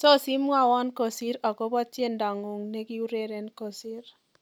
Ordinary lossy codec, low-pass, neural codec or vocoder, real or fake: none; none; none; real